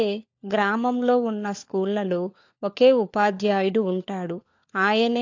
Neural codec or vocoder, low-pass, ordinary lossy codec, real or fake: codec, 16 kHz, 4 kbps, FunCodec, trained on LibriTTS, 50 frames a second; 7.2 kHz; AAC, 48 kbps; fake